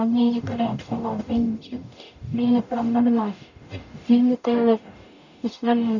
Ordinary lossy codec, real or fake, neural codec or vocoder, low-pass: none; fake; codec, 44.1 kHz, 0.9 kbps, DAC; 7.2 kHz